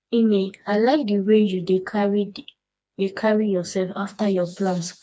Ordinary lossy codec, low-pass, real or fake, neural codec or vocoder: none; none; fake; codec, 16 kHz, 2 kbps, FreqCodec, smaller model